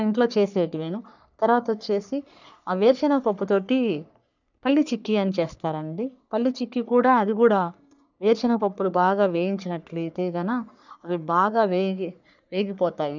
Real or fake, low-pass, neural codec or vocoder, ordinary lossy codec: fake; 7.2 kHz; codec, 44.1 kHz, 3.4 kbps, Pupu-Codec; none